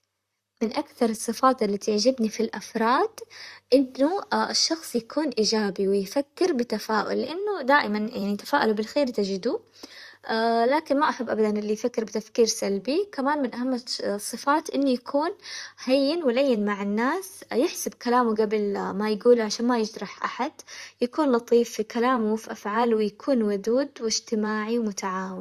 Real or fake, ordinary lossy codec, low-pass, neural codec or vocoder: fake; Opus, 64 kbps; 14.4 kHz; vocoder, 44.1 kHz, 128 mel bands, Pupu-Vocoder